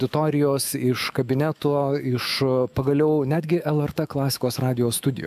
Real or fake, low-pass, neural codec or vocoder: fake; 14.4 kHz; autoencoder, 48 kHz, 128 numbers a frame, DAC-VAE, trained on Japanese speech